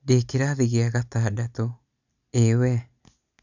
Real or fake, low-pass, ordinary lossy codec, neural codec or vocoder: real; 7.2 kHz; none; none